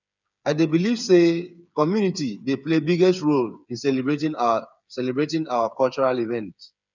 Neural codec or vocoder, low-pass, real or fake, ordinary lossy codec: codec, 16 kHz, 8 kbps, FreqCodec, smaller model; 7.2 kHz; fake; none